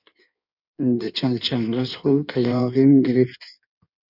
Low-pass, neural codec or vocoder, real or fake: 5.4 kHz; codec, 16 kHz in and 24 kHz out, 1.1 kbps, FireRedTTS-2 codec; fake